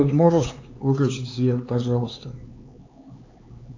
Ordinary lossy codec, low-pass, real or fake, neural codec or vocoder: AAC, 48 kbps; 7.2 kHz; fake; codec, 16 kHz, 4 kbps, X-Codec, HuBERT features, trained on LibriSpeech